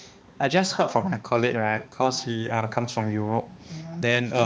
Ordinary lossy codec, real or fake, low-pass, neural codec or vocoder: none; fake; none; codec, 16 kHz, 2 kbps, X-Codec, HuBERT features, trained on balanced general audio